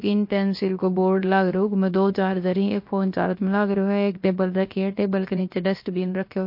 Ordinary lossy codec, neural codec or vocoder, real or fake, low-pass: MP3, 32 kbps; codec, 16 kHz, about 1 kbps, DyCAST, with the encoder's durations; fake; 5.4 kHz